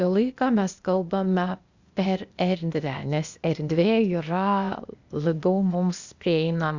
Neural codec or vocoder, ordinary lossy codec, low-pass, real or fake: codec, 16 kHz, 0.8 kbps, ZipCodec; Opus, 64 kbps; 7.2 kHz; fake